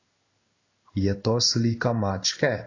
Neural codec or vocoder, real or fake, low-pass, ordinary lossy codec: codec, 16 kHz in and 24 kHz out, 1 kbps, XY-Tokenizer; fake; 7.2 kHz; AAC, 32 kbps